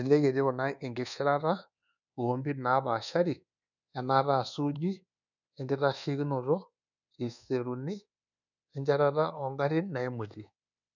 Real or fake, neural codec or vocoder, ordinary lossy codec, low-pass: fake; codec, 24 kHz, 1.2 kbps, DualCodec; none; 7.2 kHz